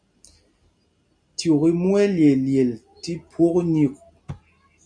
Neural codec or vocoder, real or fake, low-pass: none; real; 9.9 kHz